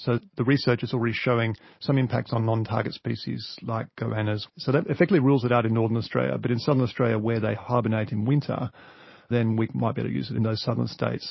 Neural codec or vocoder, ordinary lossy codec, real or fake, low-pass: none; MP3, 24 kbps; real; 7.2 kHz